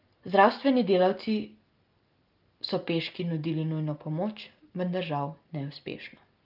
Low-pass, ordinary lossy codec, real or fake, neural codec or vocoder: 5.4 kHz; Opus, 32 kbps; real; none